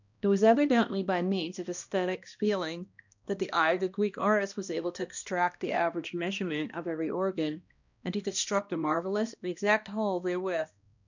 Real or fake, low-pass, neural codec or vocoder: fake; 7.2 kHz; codec, 16 kHz, 1 kbps, X-Codec, HuBERT features, trained on balanced general audio